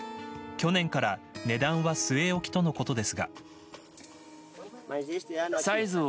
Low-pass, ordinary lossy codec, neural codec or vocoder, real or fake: none; none; none; real